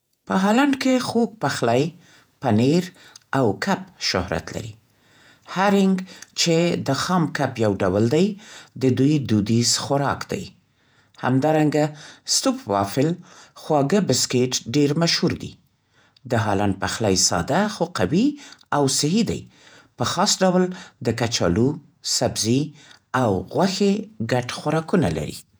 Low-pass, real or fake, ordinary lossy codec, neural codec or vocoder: none; fake; none; vocoder, 48 kHz, 128 mel bands, Vocos